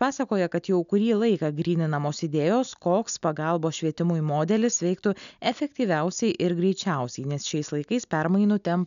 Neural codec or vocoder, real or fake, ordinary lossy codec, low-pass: none; real; MP3, 96 kbps; 7.2 kHz